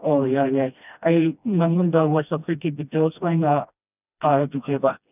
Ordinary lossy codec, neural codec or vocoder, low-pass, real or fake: none; codec, 16 kHz, 1 kbps, FreqCodec, smaller model; 3.6 kHz; fake